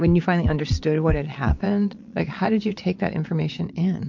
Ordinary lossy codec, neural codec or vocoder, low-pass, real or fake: MP3, 48 kbps; vocoder, 22.05 kHz, 80 mel bands, Vocos; 7.2 kHz; fake